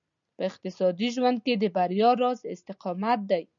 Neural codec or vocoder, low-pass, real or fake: none; 7.2 kHz; real